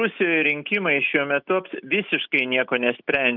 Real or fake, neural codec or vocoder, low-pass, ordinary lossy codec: real; none; 5.4 kHz; Opus, 24 kbps